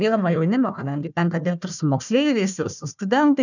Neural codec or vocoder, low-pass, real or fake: codec, 16 kHz, 1 kbps, FunCodec, trained on Chinese and English, 50 frames a second; 7.2 kHz; fake